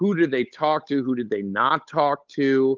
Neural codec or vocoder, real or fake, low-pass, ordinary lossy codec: codec, 16 kHz, 8 kbps, FunCodec, trained on Chinese and English, 25 frames a second; fake; 7.2 kHz; Opus, 24 kbps